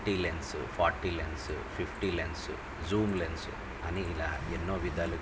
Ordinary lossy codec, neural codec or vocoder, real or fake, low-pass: none; none; real; none